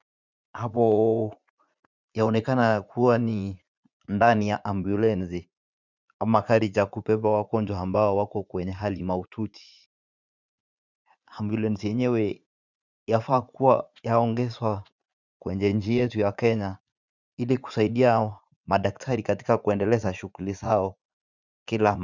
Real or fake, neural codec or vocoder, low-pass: fake; vocoder, 44.1 kHz, 80 mel bands, Vocos; 7.2 kHz